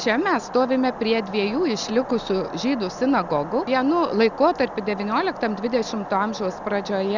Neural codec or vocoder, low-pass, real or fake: none; 7.2 kHz; real